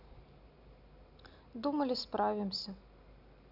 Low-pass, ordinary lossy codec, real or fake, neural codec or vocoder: 5.4 kHz; none; real; none